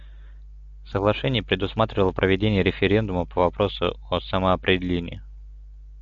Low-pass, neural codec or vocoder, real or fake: 7.2 kHz; none; real